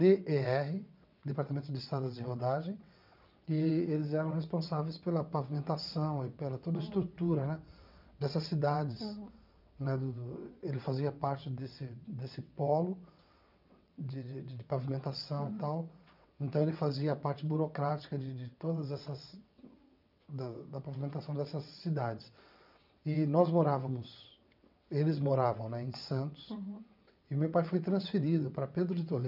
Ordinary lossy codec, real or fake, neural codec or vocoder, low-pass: none; fake; vocoder, 22.05 kHz, 80 mel bands, WaveNeXt; 5.4 kHz